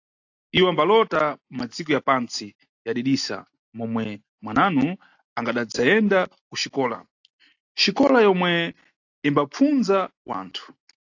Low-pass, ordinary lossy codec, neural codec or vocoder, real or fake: 7.2 kHz; AAC, 48 kbps; none; real